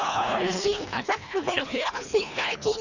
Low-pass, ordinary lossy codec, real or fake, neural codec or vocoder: 7.2 kHz; none; fake; codec, 24 kHz, 1.5 kbps, HILCodec